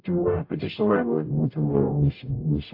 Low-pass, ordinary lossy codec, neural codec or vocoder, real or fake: 5.4 kHz; AAC, 32 kbps; codec, 44.1 kHz, 0.9 kbps, DAC; fake